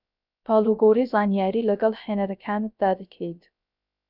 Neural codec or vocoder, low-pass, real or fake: codec, 16 kHz, 0.3 kbps, FocalCodec; 5.4 kHz; fake